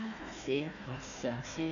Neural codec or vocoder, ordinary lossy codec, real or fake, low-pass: codec, 16 kHz, 1 kbps, FunCodec, trained on Chinese and English, 50 frames a second; none; fake; 7.2 kHz